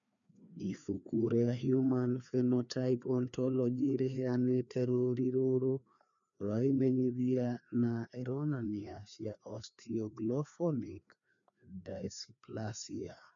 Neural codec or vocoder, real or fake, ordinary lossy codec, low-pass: codec, 16 kHz, 2 kbps, FreqCodec, larger model; fake; none; 7.2 kHz